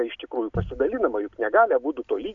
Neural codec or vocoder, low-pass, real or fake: none; 7.2 kHz; real